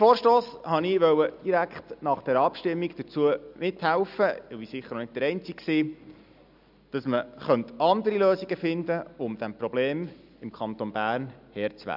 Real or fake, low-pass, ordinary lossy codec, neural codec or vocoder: real; 5.4 kHz; none; none